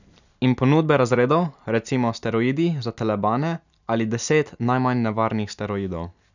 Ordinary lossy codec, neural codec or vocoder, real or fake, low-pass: none; none; real; 7.2 kHz